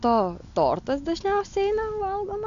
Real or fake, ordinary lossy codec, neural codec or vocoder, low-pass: real; AAC, 64 kbps; none; 7.2 kHz